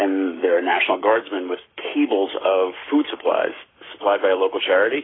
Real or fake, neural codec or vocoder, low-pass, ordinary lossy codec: fake; codec, 44.1 kHz, 7.8 kbps, Pupu-Codec; 7.2 kHz; AAC, 16 kbps